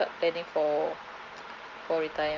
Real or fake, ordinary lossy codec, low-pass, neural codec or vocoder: real; Opus, 24 kbps; 7.2 kHz; none